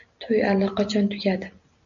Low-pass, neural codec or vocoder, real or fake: 7.2 kHz; none; real